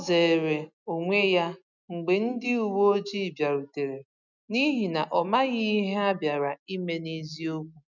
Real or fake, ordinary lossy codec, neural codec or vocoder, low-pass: real; none; none; 7.2 kHz